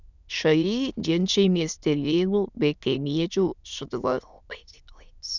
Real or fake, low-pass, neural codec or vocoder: fake; 7.2 kHz; autoencoder, 22.05 kHz, a latent of 192 numbers a frame, VITS, trained on many speakers